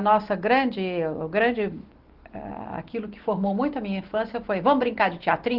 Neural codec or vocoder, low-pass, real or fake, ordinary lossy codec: none; 5.4 kHz; real; Opus, 16 kbps